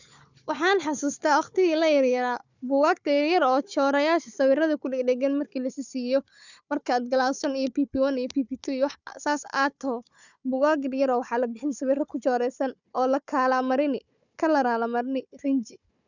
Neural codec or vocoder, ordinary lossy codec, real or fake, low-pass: codec, 16 kHz, 4 kbps, FunCodec, trained on Chinese and English, 50 frames a second; none; fake; 7.2 kHz